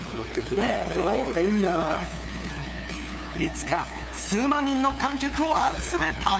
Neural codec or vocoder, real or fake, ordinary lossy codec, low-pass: codec, 16 kHz, 2 kbps, FunCodec, trained on LibriTTS, 25 frames a second; fake; none; none